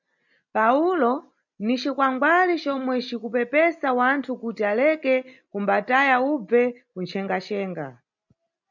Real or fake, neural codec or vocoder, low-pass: real; none; 7.2 kHz